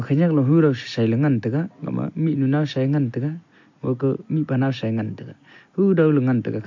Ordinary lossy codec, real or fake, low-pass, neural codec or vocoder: MP3, 48 kbps; real; 7.2 kHz; none